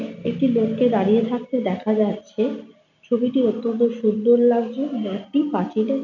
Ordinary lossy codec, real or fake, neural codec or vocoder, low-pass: none; real; none; 7.2 kHz